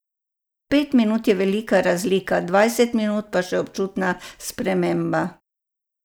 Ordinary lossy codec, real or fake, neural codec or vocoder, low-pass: none; real; none; none